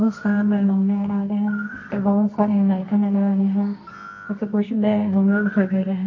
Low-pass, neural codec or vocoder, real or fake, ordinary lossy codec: 7.2 kHz; codec, 24 kHz, 0.9 kbps, WavTokenizer, medium music audio release; fake; MP3, 32 kbps